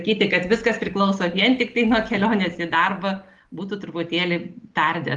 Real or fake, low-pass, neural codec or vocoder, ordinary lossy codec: real; 10.8 kHz; none; Opus, 24 kbps